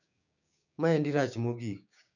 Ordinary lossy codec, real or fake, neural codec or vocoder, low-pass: none; fake; codec, 16 kHz, 6 kbps, DAC; 7.2 kHz